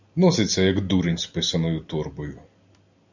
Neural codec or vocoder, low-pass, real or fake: none; 7.2 kHz; real